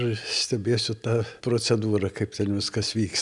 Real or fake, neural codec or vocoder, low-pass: real; none; 10.8 kHz